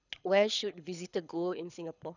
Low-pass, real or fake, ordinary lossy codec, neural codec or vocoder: 7.2 kHz; fake; none; codec, 24 kHz, 6 kbps, HILCodec